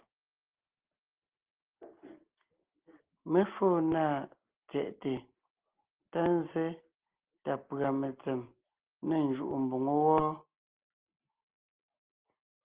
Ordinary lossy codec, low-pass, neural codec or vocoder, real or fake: Opus, 16 kbps; 3.6 kHz; none; real